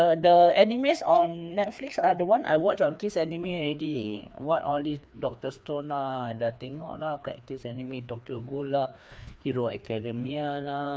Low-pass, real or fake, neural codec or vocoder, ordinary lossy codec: none; fake; codec, 16 kHz, 2 kbps, FreqCodec, larger model; none